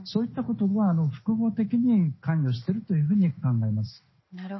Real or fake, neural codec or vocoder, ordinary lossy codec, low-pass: real; none; MP3, 24 kbps; 7.2 kHz